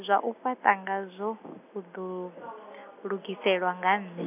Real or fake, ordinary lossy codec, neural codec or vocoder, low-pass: real; none; none; 3.6 kHz